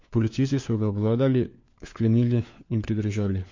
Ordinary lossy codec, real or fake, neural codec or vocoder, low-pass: MP3, 48 kbps; fake; codec, 16 kHz, 4 kbps, FunCodec, trained on LibriTTS, 50 frames a second; 7.2 kHz